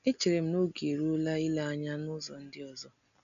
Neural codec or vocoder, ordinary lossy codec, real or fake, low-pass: none; none; real; 7.2 kHz